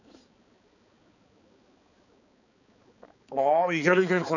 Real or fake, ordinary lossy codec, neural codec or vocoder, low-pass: fake; none; codec, 16 kHz, 4 kbps, X-Codec, HuBERT features, trained on balanced general audio; 7.2 kHz